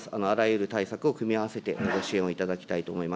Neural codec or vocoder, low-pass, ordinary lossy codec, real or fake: none; none; none; real